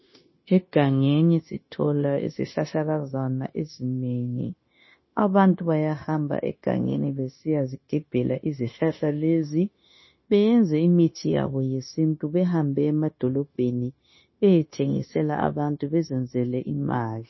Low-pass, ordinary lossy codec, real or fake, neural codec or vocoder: 7.2 kHz; MP3, 24 kbps; fake; codec, 16 kHz, 0.9 kbps, LongCat-Audio-Codec